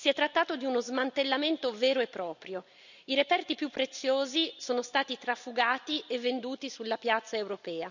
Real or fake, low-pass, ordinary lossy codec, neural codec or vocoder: real; 7.2 kHz; none; none